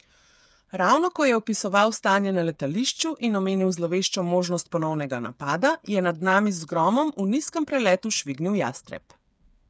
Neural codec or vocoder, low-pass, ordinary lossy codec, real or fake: codec, 16 kHz, 8 kbps, FreqCodec, smaller model; none; none; fake